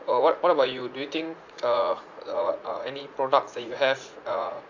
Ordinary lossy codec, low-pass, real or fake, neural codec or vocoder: AAC, 48 kbps; 7.2 kHz; fake; vocoder, 44.1 kHz, 80 mel bands, Vocos